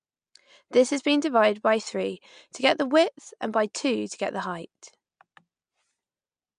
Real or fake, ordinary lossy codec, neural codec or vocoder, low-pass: real; MP3, 64 kbps; none; 9.9 kHz